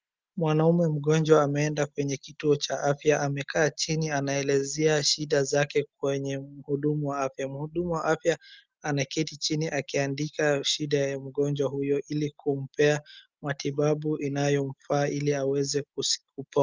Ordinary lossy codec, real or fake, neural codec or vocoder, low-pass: Opus, 32 kbps; real; none; 7.2 kHz